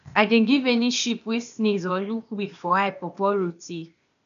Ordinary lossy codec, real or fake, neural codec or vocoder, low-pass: MP3, 96 kbps; fake; codec, 16 kHz, 0.8 kbps, ZipCodec; 7.2 kHz